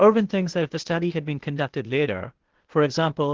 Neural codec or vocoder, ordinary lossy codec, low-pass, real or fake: codec, 16 kHz, 0.8 kbps, ZipCodec; Opus, 16 kbps; 7.2 kHz; fake